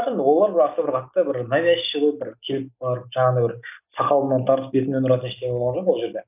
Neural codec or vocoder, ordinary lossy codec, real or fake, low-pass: none; none; real; 3.6 kHz